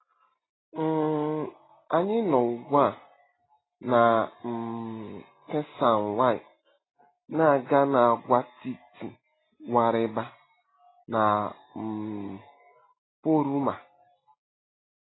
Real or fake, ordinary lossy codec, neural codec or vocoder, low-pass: real; AAC, 16 kbps; none; 7.2 kHz